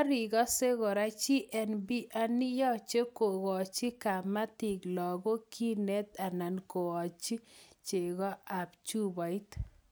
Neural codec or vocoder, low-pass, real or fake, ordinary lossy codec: none; none; real; none